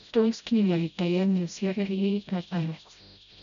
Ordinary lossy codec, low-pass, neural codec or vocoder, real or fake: none; 7.2 kHz; codec, 16 kHz, 0.5 kbps, FreqCodec, smaller model; fake